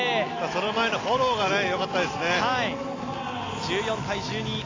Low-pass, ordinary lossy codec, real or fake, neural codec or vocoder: 7.2 kHz; AAC, 32 kbps; real; none